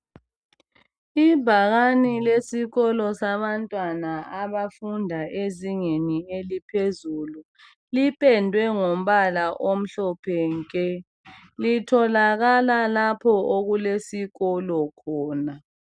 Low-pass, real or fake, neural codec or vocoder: 9.9 kHz; real; none